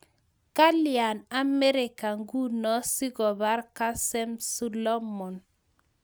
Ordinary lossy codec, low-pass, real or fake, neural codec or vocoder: none; none; real; none